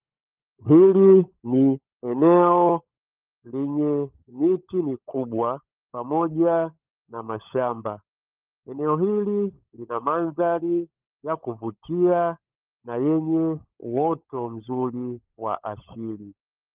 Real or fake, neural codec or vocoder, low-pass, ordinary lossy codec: fake; codec, 16 kHz, 16 kbps, FunCodec, trained on LibriTTS, 50 frames a second; 3.6 kHz; Opus, 32 kbps